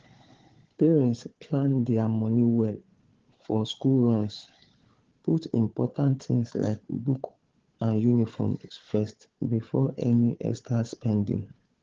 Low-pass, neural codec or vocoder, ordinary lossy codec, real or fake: 7.2 kHz; codec, 16 kHz, 4 kbps, FunCodec, trained on Chinese and English, 50 frames a second; Opus, 16 kbps; fake